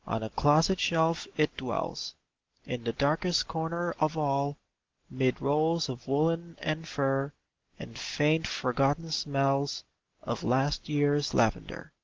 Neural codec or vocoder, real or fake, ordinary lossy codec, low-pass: none; real; Opus, 16 kbps; 7.2 kHz